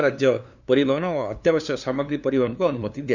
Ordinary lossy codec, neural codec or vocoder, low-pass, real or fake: none; codec, 16 kHz, 2 kbps, FunCodec, trained on LibriTTS, 25 frames a second; 7.2 kHz; fake